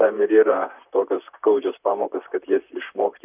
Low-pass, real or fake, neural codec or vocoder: 3.6 kHz; fake; vocoder, 44.1 kHz, 128 mel bands, Pupu-Vocoder